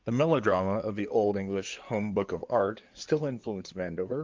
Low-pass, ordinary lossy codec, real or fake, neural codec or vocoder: 7.2 kHz; Opus, 24 kbps; fake; codec, 16 kHz in and 24 kHz out, 2.2 kbps, FireRedTTS-2 codec